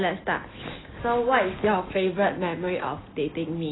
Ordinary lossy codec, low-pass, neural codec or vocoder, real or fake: AAC, 16 kbps; 7.2 kHz; none; real